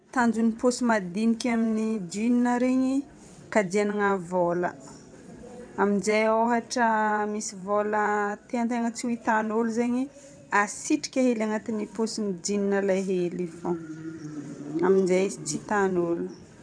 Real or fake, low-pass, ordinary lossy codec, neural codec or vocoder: fake; 9.9 kHz; none; vocoder, 22.05 kHz, 80 mel bands, WaveNeXt